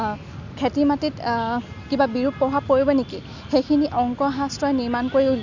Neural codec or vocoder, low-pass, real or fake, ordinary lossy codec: none; 7.2 kHz; real; none